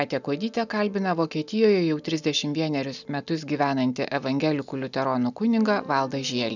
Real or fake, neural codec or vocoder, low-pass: real; none; 7.2 kHz